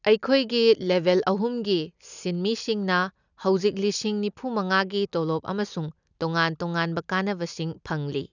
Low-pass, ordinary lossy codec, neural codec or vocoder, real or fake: 7.2 kHz; none; none; real